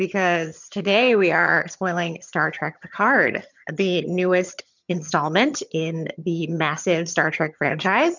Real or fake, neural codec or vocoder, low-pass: fake; vocoder, 22.05 kHz, 80 mel bands, HiFi-GAN; 7.2 kHz